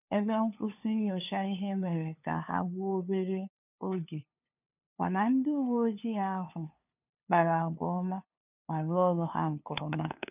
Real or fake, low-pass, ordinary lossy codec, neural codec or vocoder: fake; 3.6 kHz; none; codec, 16 kHz, 2 kbps, FunCodec, trained on LibriTTS, 25 frames a second